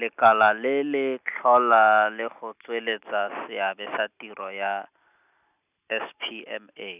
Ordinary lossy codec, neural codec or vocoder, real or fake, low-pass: none; none; real; 3.6 kHz